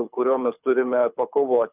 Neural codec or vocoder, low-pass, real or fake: codec, 24 kHz, 6 kbps, HILCodec; 3.6 kHz; fake